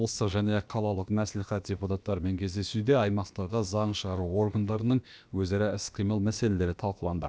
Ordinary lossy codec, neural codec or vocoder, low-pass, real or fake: none; codec, 16 kHz, about 1 kbps, DyCAST, with the encoder's durations; none; fake